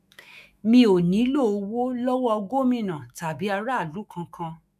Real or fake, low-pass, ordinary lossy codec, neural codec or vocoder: fake; 14.4 kHz; MP3, 96 kbps; autoencoder, 48 kHz, 128 numbers a frame, DAC-VAE, trained on Japanese speech